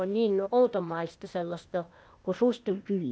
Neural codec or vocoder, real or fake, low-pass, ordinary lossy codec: codec, 16 kHz, 0.8 kbps, ZipCodec; fake; none; none